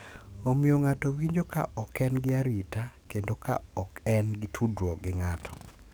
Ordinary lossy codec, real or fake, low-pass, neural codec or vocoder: none; fake; none; codec, 44.1 kHz, 7.8 kbps, DAC